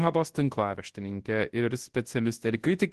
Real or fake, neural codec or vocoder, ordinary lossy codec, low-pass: fake; codec, 24 kHz, 0.9 kbps, WavTokenizer, large speech release; Opus, 16 kbps; 10.8 kHz